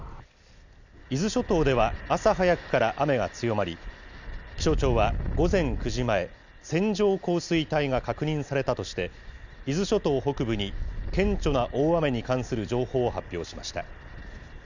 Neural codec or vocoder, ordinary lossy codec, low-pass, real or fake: none; none; 7.2 kHz; real